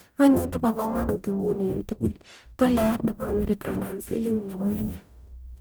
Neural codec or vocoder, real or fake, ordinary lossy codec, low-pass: codec, 44.1 kHz, 0.9 kbps, DAC; fake; none; none